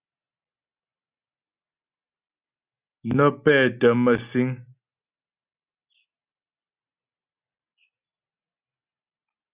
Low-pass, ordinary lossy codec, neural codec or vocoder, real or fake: 3.6 kHz; Opus, 64 kbps; none; real